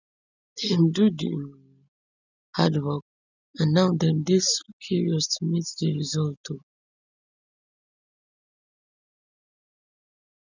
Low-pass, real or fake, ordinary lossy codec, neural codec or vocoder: 7.2 kHz; real; none; none